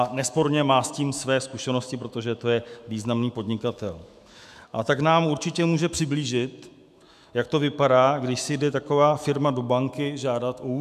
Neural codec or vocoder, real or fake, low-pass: autoencoder, 48 kHz, 128 numbers a frame, DAC-VAE, trained on Japanese speech; fake; 14.4 kHz